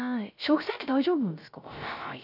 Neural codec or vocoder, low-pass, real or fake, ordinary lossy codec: codec, 16 kHz, 0.3 kbps, FocalCodec; 5.4 kHz; fake; none